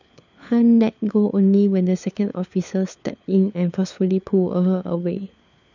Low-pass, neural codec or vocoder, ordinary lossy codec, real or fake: 7.2 kHz; codec, 16 kHz, 4 kbps, FunCodec, trained on LibriTTS, 50 frames a second; none; fake